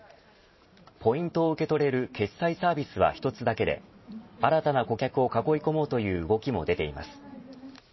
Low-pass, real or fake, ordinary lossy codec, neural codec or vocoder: 7.2 kHz; fake; MP3, 24 kbps; vocoder, 44.1 kHz, 128 mel bands every 512 samples, BigVGAN v2